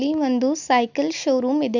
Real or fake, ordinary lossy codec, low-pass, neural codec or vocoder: real; none; 7.2 kHz; none